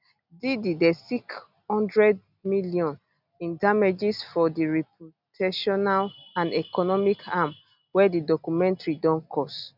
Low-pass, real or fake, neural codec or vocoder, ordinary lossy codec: 5.4 kHz; real; none; none